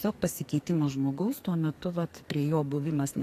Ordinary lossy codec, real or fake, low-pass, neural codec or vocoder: AAC, 64 kbps; fake; 14.4 kHz; codec, 44.1 kHz, 2.6 kbps, SNAC